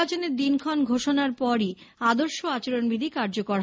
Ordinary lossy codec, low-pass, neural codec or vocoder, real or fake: none; none; none; real